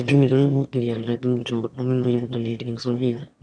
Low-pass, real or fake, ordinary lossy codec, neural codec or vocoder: 9.9 kHz; fake; none; autoencoder, 22.05 kHz, a latent of 192 numbers a frame, VITS, trained on one speaker